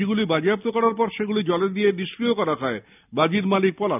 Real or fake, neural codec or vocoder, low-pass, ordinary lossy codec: real; none; 3.6 kHz; none